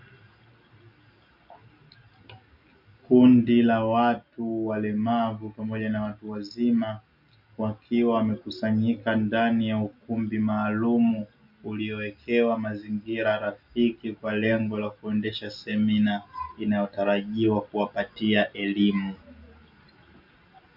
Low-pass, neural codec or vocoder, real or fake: 5.4 kHz; none; real